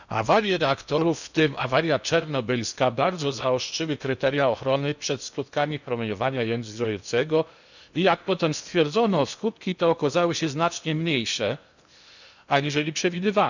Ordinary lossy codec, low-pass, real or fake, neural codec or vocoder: none; 7.2 kHz; fake; codec, 16 kHz in and 24 kHz out, 0.8 kbps, FocalCodec, streaming, 65536 codes